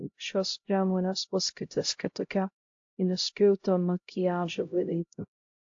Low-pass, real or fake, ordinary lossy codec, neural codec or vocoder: 7.2 kHz; fake; AAC, 48 kbps; codec, 16 kHz, 0.5 kbps, X-Codec, HuBERT features, trained on LibriSpeech